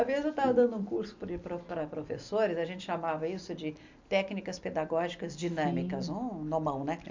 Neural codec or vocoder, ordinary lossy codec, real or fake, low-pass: none; MP3, 64 kbps; real; 7.2 kHz